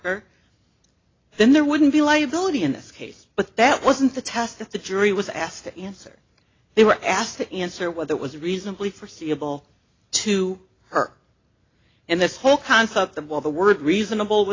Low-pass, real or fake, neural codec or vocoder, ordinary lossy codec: 7.2 kHz; real; none; AAC, 32 kbps